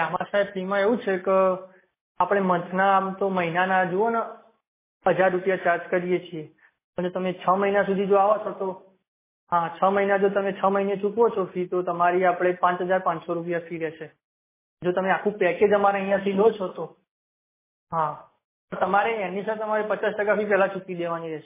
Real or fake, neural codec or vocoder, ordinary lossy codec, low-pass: real; none; MP3, 16 kbps; 3.6 kHz